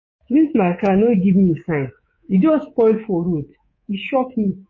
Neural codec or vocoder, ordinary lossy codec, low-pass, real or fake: codec, 24 kHz, 3.1 kbps, DualCodec; MP3, 32 kbps; 7.2 kHz; fake